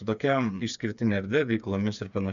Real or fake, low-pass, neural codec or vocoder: fake; 7.2 kHz; codec, 16 kHz, 4 kbps, FreqCodec, smaller model